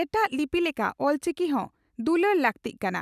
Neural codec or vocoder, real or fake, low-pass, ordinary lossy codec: none; real; 14.4 kHz; none